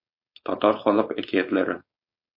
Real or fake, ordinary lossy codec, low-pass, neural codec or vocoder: fake; MP3, 32 kbps; 5.4 kHz; codec, 16 kHz, 4.8 kbps, FACodec